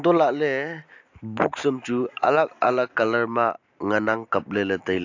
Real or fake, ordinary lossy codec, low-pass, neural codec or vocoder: real; AAC, 48 kbps; 7.2 kHz; none